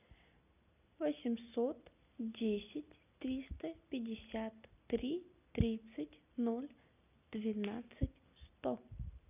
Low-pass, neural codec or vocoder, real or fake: 3.6 kHz; none; real